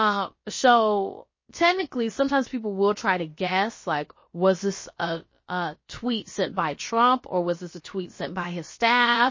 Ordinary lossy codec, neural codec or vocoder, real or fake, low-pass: MP3, 32 kbps; codec, 16 kHz, about 1 kbps, DyCAST, with the encoder's durations; fake; 7.2 kHz